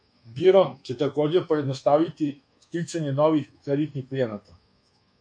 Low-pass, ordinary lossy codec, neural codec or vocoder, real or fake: 9.9 kHz; MP3, 48 kbps; codec, 24 kHz, 1.2 kbps, DualCodec; fake